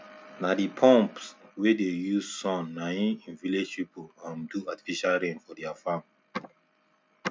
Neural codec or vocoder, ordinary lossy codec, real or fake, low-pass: none; none; real; none